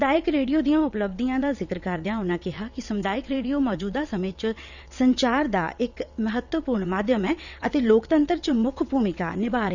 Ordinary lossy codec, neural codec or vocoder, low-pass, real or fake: none; vocoder, 22.05 kHz, 80 mel bands, WaveNeXt; 7.2 kHz; fake